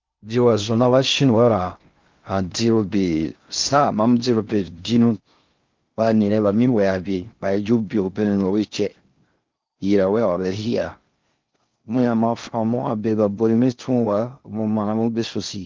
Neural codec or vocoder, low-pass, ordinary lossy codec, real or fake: codec, 16 kHz in and 24 kHz out, 0.6 kbps, FocalCodec, streaming, 4096 codes; 7.2 kHz; Opus, 32 kbps; fake